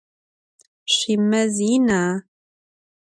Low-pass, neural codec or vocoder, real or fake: 9.9 kHz; none; real